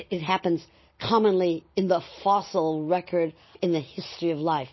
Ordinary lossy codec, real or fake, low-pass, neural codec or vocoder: MP3, 24 kbps; real; 7.2 kHz; none